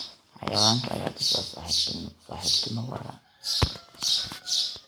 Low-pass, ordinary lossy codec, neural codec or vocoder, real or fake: none; none; codec, 44.1 kHz, 7.8 kbps, Pupu-Codec; fake